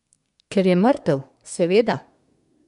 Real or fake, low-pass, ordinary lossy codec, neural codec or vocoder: fake; 10.8 kHz; none; codec, 24 kHz, 1 kbps, SNAC